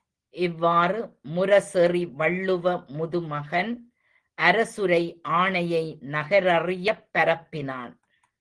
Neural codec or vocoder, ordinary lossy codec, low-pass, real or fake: none; Opus, 16 kbps; 9.9 kHz; real